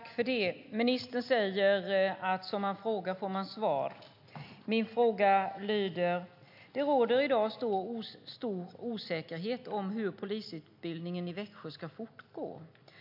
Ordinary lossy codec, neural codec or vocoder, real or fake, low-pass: AAC, 48 kbps; none; real; 5.4 kHz